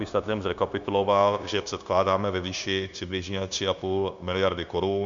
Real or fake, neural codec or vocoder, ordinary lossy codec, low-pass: fake; codec, 16 kHz, 0.9 kbps, LongCat-Audio-Codec; Opus, 64 kbps; 7.2 kHz